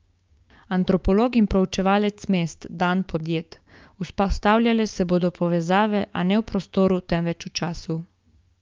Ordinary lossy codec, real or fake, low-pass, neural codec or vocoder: Opus, 24 kbps; fake; 7.2 kHz; codec, 16 kHz, 6 kbps, DAC